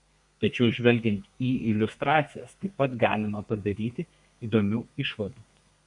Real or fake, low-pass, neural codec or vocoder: fake; 10.8 kHz; codec, 32 kHz, 1.9 kbps, SNAC